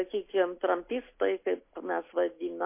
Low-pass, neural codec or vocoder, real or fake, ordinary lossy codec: 3.6 kHz; none; real; MP3, 24 kbps